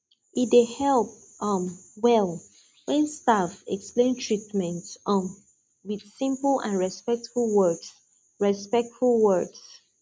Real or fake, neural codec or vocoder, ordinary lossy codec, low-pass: real; none; none; none